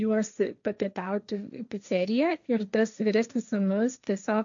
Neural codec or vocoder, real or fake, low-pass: codec, 16 kHz, 1.1 kbps, Voila-Tokenizer; fake; 7.2 kHz